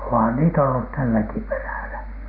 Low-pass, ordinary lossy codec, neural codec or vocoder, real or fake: 5.4 kHz; none; none; real